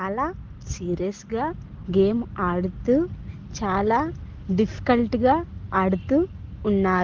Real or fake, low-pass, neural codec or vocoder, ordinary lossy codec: real; 7.2 kHz; none; Opus, 16 kbps